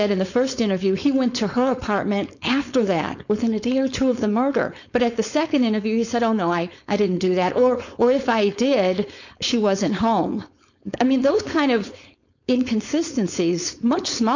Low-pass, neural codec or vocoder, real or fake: 7.2 kHz; codec, 16 kHz, 4.8 kbps, FACodec; fake